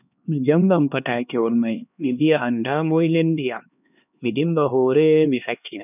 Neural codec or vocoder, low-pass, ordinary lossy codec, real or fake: codec, 16 kHz, 2 kbps, X-Codec, HuBERT features, trained on LibriSpeech; 3.6 kHz; none; fake